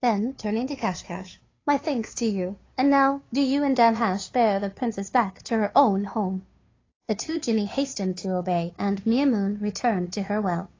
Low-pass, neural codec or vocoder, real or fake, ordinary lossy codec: 7.2 kHz; codec, 16 kHz, 2 kbps, FunCodec, trained on Chinese and English, 25 frames a second; fake; AAC, 32 kbps